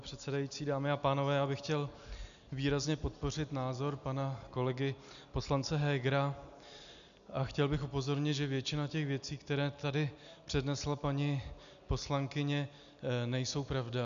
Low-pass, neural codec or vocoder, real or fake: 7.2 kHz; none; real